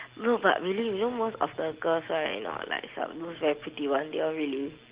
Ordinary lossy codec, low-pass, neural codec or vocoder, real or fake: Opus, 16 kbps; 3.6 kHz; none; real